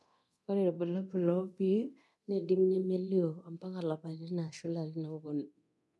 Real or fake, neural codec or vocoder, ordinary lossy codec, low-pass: fake; codec, 24 kHz, 0.9 kbps, DualCodec; none; none